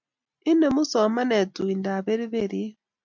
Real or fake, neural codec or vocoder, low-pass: real; none; 7.2 kHz